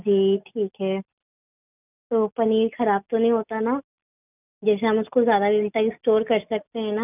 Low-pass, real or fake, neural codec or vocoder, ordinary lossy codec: 3.6 kHz; real; none; none